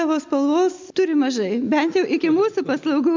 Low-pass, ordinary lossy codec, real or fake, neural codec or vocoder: 7.2 kHz; MP3, 64 kbps; real; none